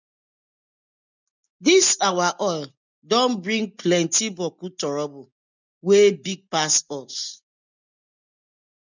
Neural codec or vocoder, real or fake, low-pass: none; real; 7.2 kHz